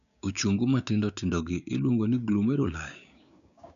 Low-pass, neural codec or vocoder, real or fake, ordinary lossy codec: 7.2 kHz; codec, 16 kHz, 6 kbps, DAC; fake; none